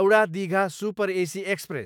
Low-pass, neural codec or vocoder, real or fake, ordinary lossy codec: 19.8 kHz; autoencoder, 48 kHz, 128 numbers a frame, DAC-VAE, trained on Japanese speech; fake; none